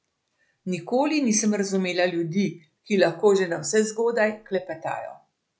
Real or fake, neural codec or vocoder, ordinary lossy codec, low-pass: real; none; none; none